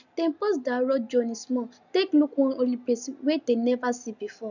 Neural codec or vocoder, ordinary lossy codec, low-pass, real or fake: none; none; 7.2 kHz; real